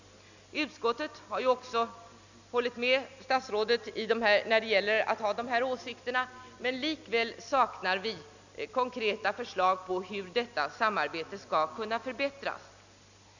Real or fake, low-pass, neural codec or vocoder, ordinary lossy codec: real; 7.2 kHz; none; none